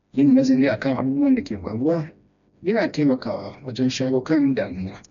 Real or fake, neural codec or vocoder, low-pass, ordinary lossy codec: fake; codec, 16 kHz, 1 kbps, FreqCodec, smaller model; 7.2 kHz; MP3, 96 kbps